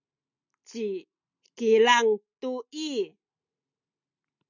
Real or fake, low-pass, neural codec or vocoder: real; 7.2 kHz; none